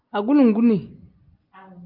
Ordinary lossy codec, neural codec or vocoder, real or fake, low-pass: Opus, 24 kbps; none; real; 5.4 kHz